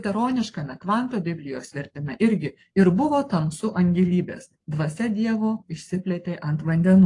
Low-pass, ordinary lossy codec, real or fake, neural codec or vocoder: 10.8 kHz; AAC, 48 kbps; fake; codec, 44.1 kHz, 7.8 kbps, Pupu-Codec